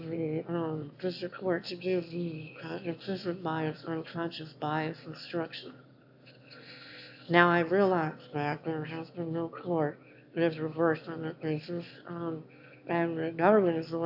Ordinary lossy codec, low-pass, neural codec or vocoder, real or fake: AAC, 48 kbps; 5.4 kHz; autoencoder, 22.05 kHz, a latent of 192 numbers a frame, VITS, trained on one speaker; fake